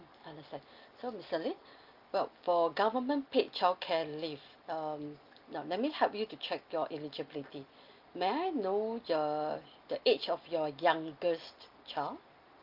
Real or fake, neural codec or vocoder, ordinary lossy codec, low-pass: real; none; Opus, 32 kbps; 5.4 kHz